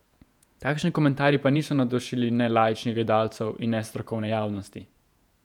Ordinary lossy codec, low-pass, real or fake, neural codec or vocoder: none; 19.8 kHz; fake; vocoder, 48 kHz, 128 mel bands, Vocos